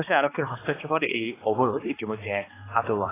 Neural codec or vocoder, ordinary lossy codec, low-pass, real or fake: codec, 16 kHz, 2 kbps, X-Codec, HuBERT features, trained on balanced general audio; AAC, 16 kbps; 3.6 kHz; fake